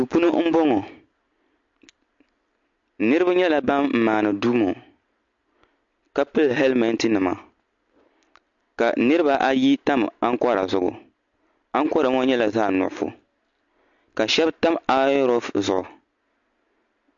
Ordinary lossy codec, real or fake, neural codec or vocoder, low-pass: MP3, 48 kbps; real; none; 7.2 kHz